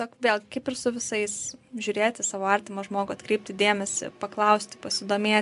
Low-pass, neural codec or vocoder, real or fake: 10.8 kHz; none; real